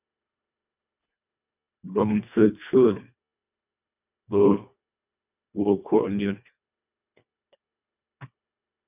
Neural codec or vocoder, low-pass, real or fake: codec, 24 kHz, 1.5 kbps, HILCodec; 3.6 kHz; fake